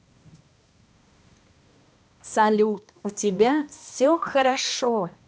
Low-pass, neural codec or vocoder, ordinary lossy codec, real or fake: none; codec, 16 kHz, 1 kbps, X-Codec, HuBERT features, trained on balanced general audio; none; fake